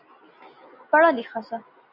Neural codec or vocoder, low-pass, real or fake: none; 5.4 kHz; real